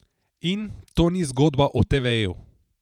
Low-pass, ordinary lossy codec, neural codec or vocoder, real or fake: 19.8 kHz; none; vocoder, 48 kHz, 128 mel bands, Vocos; fake